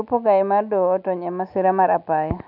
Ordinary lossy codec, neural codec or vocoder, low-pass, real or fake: none; none; 5.4 kHz; real